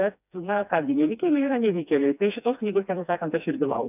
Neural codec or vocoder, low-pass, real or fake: codec, 16 kHz, 2 kbps, FreqCodec, smaller model; 3.6 kHz; fake